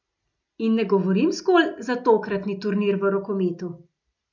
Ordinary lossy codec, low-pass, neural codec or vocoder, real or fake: none; 7.2 kHz; none; real